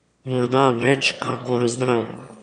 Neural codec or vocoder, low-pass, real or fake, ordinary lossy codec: autoencoder, 22.05 kHz, a latent of 192 numbers a frame, VITS, trained on one speaker; 9.9 kHz; fake; none